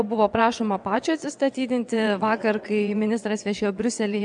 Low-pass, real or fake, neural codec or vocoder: 9.9 kHz; fake; vocoder, 22.05 kHz, 80 mel bands, WaveNeXt